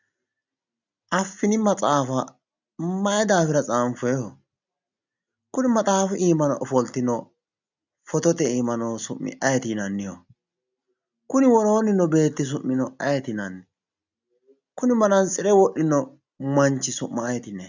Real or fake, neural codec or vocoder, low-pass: real; none; 7.2 kHz